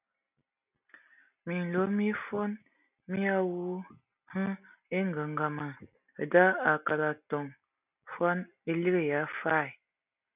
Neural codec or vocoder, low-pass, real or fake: none; 3.6 kHz; real